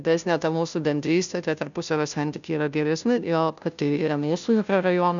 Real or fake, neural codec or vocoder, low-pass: fake; codec, 16 kHz, 0.5 kbps, FunCodec, trained on Chinese and English, 25 frames a second; 7.2 kHz